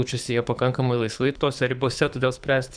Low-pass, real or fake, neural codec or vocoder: 9.9 kHz; fake; codec, 24 kHz, 6 kbps, HILCodec